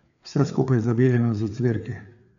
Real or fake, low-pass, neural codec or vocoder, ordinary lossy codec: fake; 7.2 kHz; codec, 16 kHz, 4 kbps, FreqCodec, larger model; MP3, 96 kbps